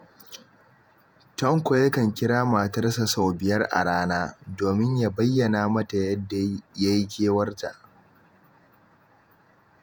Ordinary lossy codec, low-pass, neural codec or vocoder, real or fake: none; none; vocoder, 48 kHz, 128 mel bands, Vocos; fake